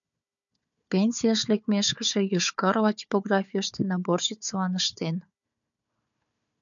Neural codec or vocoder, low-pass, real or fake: codec, 16 kHz, 4 kbps, FunCodec, trained on Chinese and English, 50 frames a second; 7.2 kHz; fake